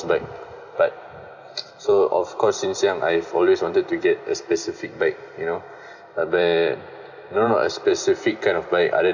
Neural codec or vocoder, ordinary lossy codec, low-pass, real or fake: vocoder, 44.1 kHz, 128 mel bands every 256 samples, BigVGAN v2; none; 7.2 kHz; fake